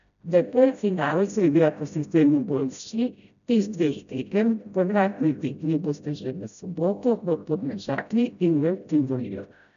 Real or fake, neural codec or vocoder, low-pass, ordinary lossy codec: fake; codec, 16 kHz, 0.5 kbps, FreqCodec, smaller model; 7.2 kHz; none